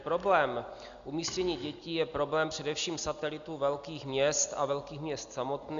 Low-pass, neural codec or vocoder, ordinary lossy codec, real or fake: 7.2 kHz; none; Opus, 64 kbps; real